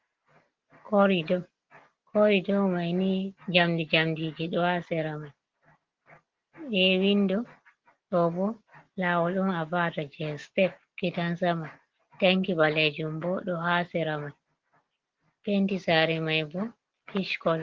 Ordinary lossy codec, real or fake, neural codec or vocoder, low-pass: Opus, 16 kbps; real; none; 7.2 kHz